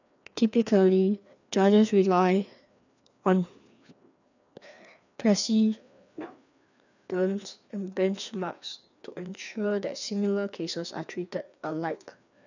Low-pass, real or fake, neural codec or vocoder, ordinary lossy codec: 7.2 kHz; fake; codec, 16 kHz, 2 kbps, FreqCodec, larger model; none